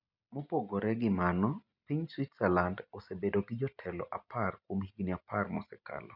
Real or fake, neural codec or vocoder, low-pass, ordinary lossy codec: real; none; 5.4 kHz; none